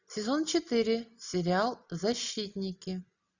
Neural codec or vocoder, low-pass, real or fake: none; 7.2 kHz; real